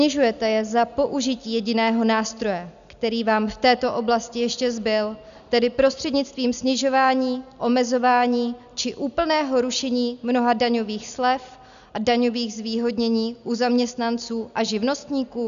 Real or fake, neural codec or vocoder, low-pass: real; none; 7.2 kHz